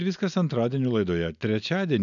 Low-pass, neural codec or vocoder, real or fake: 7.2 kHz; none; real